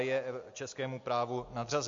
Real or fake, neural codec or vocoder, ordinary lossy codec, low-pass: real; none; MP3, 48 kbps; 7.2 kHz